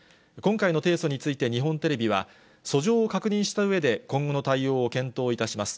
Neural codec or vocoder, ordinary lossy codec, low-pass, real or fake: none; none; none; real